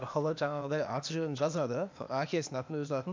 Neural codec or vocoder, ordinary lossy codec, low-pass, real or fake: codec, 16 kHz, 0.8 kbps, ZipCodec; MP3, 64 kbps; 7.2 kHz; fake